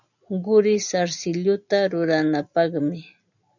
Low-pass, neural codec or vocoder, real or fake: 7.2 kHz; none; real